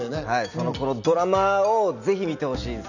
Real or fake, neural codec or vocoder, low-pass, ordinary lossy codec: real; none; 7.2 kHz; none